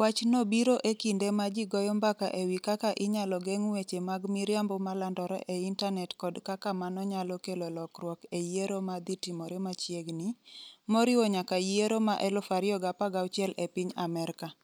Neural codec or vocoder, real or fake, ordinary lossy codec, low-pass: none; real; none; none